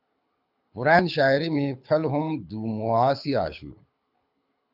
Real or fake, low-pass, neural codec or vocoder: fake; 5.4 kHz; codec, 24 kHz, 6 kbps, HILCodec